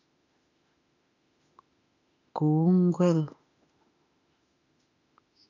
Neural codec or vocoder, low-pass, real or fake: autoencoder, 48 kHz, 32 numbers a frame, DAC-VAE, trained on Japanese speech; 7.2 kHz; fake